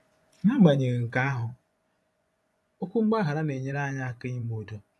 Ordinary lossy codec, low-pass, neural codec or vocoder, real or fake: none; none; vocoder, 24 kHz, 100 mel bands, Vocos; fake